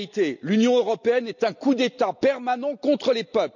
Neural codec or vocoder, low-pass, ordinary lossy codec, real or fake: none; 7.2 kHz; none; real